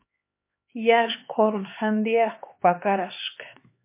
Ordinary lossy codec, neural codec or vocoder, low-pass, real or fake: MP3, 24 kbps; codec, 16 kHz, 4 kbps, X-Codec, HuBERT features, trained on LibriSpeech; 3.6 kHz; fake